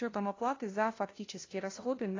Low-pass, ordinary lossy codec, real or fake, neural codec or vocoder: 7.2 kHz; AAC, 32 kbps; fake; codec, 16 kHz, 0.5 kbps, FunCodec, trained on LibriTTS, 25 frames a second